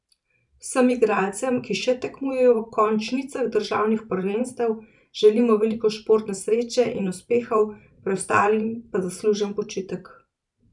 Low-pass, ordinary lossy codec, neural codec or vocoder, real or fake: 10.8 kHz; none; vocoder, 44.1 kHz, 128 mel bands every 512 samples, BigVGAN v2; fake